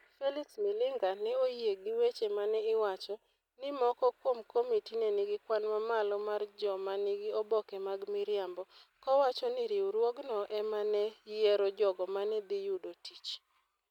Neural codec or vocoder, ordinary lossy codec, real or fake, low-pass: none; none; real; 19.8 kHz